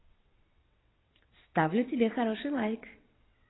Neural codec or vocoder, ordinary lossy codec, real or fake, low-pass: vocoder, 22.05 kHz, 80 mel bands, WaveNeXt; AAC, 16 kbps; fake; 7.2 kHz